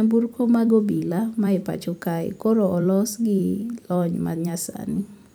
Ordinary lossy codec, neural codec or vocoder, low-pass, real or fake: none; vocoder, 44.1 kHz, 128 mel bands every 256 samples, BigVGAN v2; none; fake